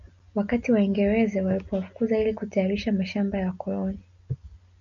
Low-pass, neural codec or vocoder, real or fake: 7.2 kHz; none; real